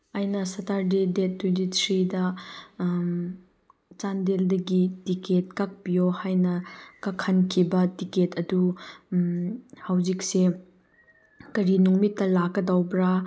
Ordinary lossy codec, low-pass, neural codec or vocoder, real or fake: none; none; none; real